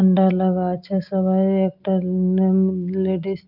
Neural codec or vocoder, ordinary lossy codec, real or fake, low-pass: none; Opus, 24 kbps; real; 5.4 kHz